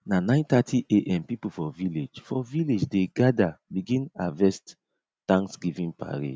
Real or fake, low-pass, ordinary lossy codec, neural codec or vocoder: real; none; none; none